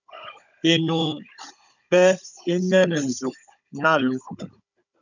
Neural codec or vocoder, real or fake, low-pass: codec, 16 kHz, 16 kbps, FunCodec, trained on Chinese and English, 50 frames a second; fake; 7.2 kHz